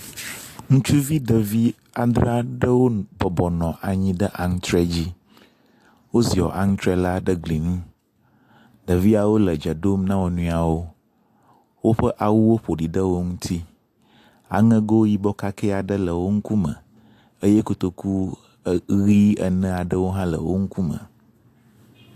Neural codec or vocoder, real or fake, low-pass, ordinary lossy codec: none; real; 14.4 kHz; AAC, 64 kbps